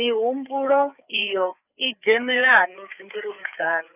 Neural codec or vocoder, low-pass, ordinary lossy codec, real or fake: codec, 16 kHz, 4 kbps, FreqCodec, larger model; 3.6 kHz; none; fake